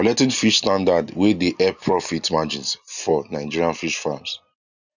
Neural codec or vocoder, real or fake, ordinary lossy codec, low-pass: none; real; none; 7.2 kHz